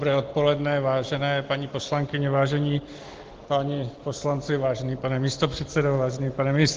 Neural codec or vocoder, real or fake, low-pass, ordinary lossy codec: none; real; 7.2 kHz; Opus, 16 kbps